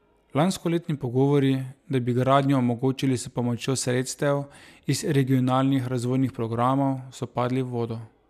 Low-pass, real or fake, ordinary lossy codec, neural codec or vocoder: 14.4 kHz; real; none; none